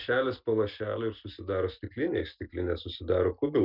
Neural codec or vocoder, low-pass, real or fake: none; 5.4 kHz; real